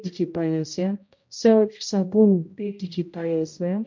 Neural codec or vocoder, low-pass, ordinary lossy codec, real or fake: codec, 16 kHz, 0.5 kbps, X-Codec, HuBERT features, trained on general audio; 7.2 kHz; MP3, 48 kbps; fake